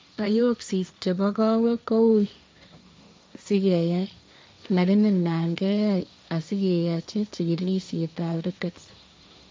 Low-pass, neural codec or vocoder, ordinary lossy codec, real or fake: none; codec, 16 kHz, 1.1 kbps, Voila-Tokenizer; none; fake